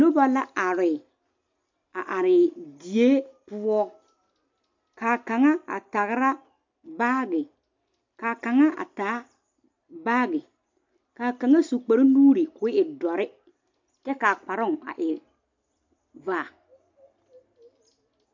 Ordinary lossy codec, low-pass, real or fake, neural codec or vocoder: MP3, 48 kbps; 7.2 kHz; real; none